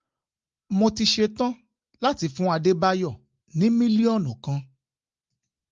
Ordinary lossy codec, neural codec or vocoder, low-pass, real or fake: Opus, 32 kbps; none; 7.2 kHz; real